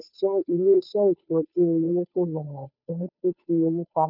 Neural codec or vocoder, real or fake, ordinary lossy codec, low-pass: codec, 16 kHz, 2 kbps, FunCodec, trained on Chinese and English, 25 frames a second; fake; none; 5.4 kHz